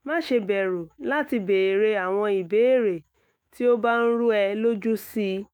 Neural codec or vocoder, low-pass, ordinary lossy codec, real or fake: autoencoder, 48 kHz, 128 numbers a frame, DAC-VAE, trained on Japanese speech; none; none; fake